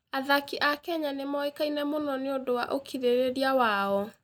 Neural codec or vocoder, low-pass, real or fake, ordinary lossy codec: none; 19.8 kHz; real; none